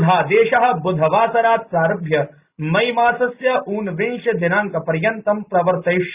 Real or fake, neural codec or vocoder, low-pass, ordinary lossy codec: real; none; 3.6 kHz; Opus, 64 kbps